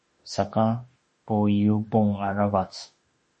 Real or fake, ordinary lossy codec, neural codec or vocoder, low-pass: fake; MP3, 32 kbps; autoencoder, 48 kHz, 32 numbers a frame, DAC-VAE, trained on Japanese speech; 10.8 kHz